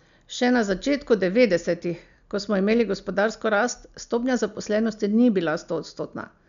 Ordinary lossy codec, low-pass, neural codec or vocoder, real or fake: none; 7.2 kHz; none; real